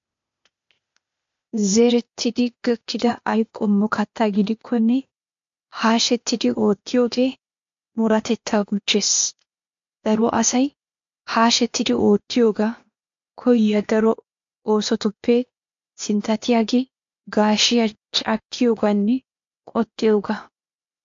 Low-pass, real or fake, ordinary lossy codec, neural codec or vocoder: 7.2 kHz; fake; MP3, 48 kbps; codec, 16 kHz, 0.8 kbps, ZipCodec